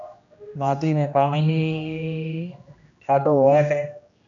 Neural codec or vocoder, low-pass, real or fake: codec, 16 kHz, 1 kbps, X-Codec, HuBERT features, trained on general audio; 7.2 kHz; fake